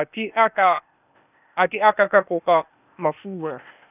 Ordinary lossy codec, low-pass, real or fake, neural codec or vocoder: none; 3.6 kHz; fake; codec, 16 kHz, 0.8 kbps, ZipCodec